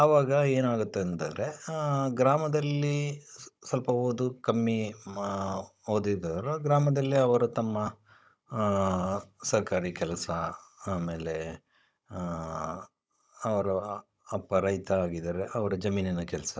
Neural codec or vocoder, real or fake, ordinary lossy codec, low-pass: codec, 16 kHz, 16 kbps, FunCodec, trained on Chinese and English, 50 frames a second; fake; none; none